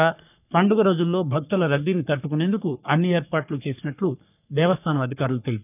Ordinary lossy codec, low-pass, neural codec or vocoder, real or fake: none; 3.6 kHz; codec, 44.1 kHz, 3.4 kbps, Pupu-Codec; fake